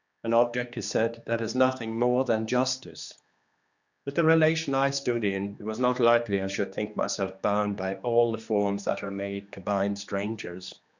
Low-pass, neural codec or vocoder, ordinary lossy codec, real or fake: 7.2 kHz; codec, 16 kHz, 2 kbps, X-Codec, HuBERT features, trained on general audio; Opus, 64 kbps; fake